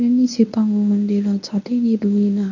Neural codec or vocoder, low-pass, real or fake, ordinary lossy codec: codec, 24 kHz, 0.9 kbps, WavTokenizer, medium speech release version 1; 7.2 kHz; fake; none